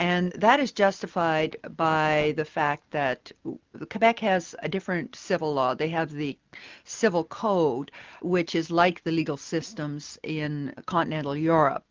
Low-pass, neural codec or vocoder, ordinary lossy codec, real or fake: 7.2 kHz; none; Opus, 32 kbps; real